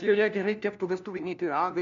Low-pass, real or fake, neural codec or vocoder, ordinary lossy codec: 7.2 kHz; fake; codec, 16 kHz, 1 kbps, FunCodec, trained on LibriTTS, 50 frames a second; MP3, 64 kbps